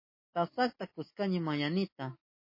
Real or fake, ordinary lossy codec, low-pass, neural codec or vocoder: real; MP3, 24 kbps; 5.4 kHz; none